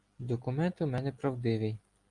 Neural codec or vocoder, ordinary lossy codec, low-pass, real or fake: none; Opus, 32 kbps; 10.8 kHz; real